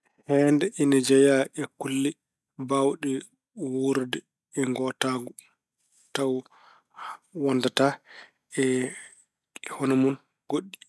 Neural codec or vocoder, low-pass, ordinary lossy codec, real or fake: none; none; none; real